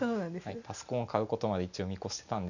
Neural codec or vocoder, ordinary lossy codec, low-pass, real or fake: none; none; 7.2 kHz; real